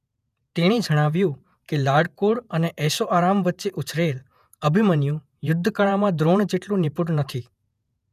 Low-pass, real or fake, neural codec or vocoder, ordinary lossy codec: 14.4 kHz; fake; vocoder, 48 kHz, 128 mel bands, Vocos; none